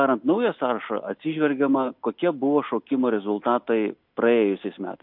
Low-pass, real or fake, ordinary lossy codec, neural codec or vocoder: 5.4 kHz; real; MP3, 48 kbps; none